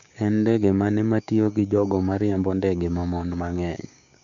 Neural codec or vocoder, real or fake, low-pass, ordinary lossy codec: codec, 16 kHz, 6 kbps, DAC; fake; 7.2 kHz; none